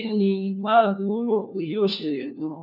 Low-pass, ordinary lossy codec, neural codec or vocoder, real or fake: 5.4 kHz; none; codec, 16 kHz, 1 kbps, FunCodec, trained on LibriTTS, 50 frames a second; fake